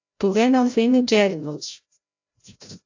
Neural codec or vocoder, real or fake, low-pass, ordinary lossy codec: codec, 16 kHz, 0.5 kbps, FreqCodec, larger model; fake; 7.2 kHz; AAC, 48 kbps